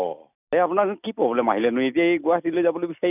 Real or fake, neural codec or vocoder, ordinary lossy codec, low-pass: real; none; none; 3.6 kHz